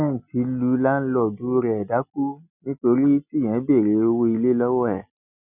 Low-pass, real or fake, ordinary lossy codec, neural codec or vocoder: 3.6 kHz; real; none; none